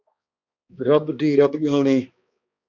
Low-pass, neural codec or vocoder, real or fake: 7.2 kHz; codec, 16 kHz, 2 kbps, X-Codec, HuBERT features, trained on balanced general audio; fake